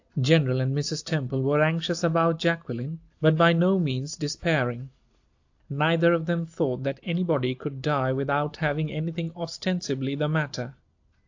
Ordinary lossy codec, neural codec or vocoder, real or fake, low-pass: AAC, 48 kbps; none; real; 7.2 kHz